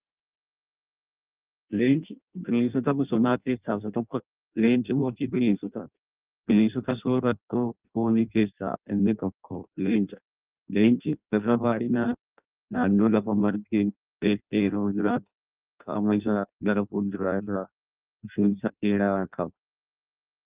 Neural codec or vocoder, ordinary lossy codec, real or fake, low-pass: codec, 16 kHz in and 24 kHz out, 0.6 kbps, FireRedTTS-2 codec; Opus, 32 kbps; fake; 3.6 kHz